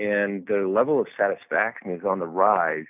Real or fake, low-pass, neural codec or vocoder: real; 3.6 kHz; none